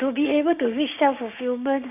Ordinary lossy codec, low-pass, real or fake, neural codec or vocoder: none; 3.6 kHz; fake; codec, 16 kHz, 6 kbps, DAC